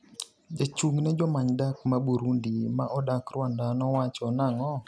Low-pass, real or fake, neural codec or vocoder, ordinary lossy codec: none; real; none; none